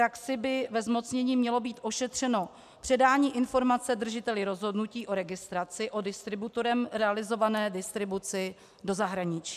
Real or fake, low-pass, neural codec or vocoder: real; 14.4 kHz; none